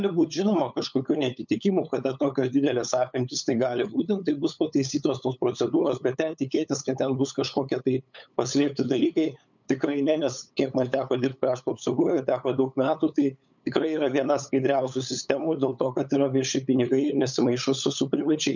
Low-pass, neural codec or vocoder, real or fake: 7.2 kHz; codec, 16 kHz, 8 kbps, FunCodec, trained on LibriTTS, 25 frames a second; fake